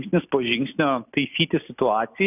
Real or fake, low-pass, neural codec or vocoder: real; 3.6 kHz; none